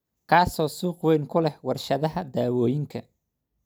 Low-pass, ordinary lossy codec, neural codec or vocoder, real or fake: none; none; none; real